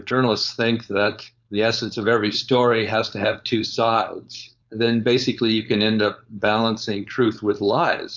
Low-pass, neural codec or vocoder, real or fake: 7.2 kHz; codec, 16 kHz, 4.8 kbps, FACodec; fake